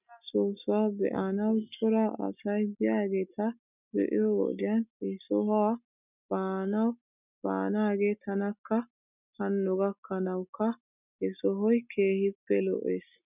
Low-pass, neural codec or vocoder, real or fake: 3.6 kHz; none; real